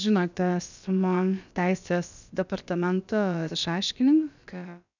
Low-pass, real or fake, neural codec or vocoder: 7.2 kHz; fake; codec, 16 kHz, about 1 kbps, DyCAST, with the encoder's durations